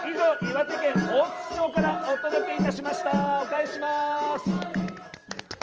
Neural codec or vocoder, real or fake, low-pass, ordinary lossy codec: vocoder, 44.1 kHz, 128 mel bands every 512 samples, BigVGAN v2; fake; 7.2 kHz; Opus, 24 kbps